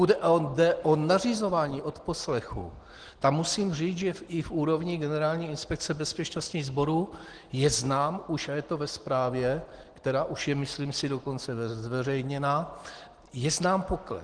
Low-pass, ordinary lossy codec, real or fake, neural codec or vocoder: 9.9 kHz; Opus, 16 kbps; real; none